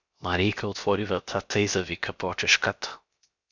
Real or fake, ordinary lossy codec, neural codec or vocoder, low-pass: fake; Opus, 64 kbps; codec, 16 kHz, 0.7 kbps, FocalCodec; 7.2 kHz